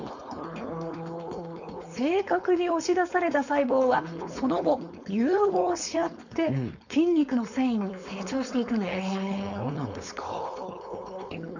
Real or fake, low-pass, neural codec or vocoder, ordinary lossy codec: fake; 7.2 kHz; codec, 16 kHz, 4.8 kbps, FACodec; none